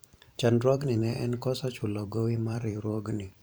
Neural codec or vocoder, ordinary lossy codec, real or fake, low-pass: vocoder, 44.1 kHz, 128 mel bands every 512 samples, BigVGAN v2; none; fake; none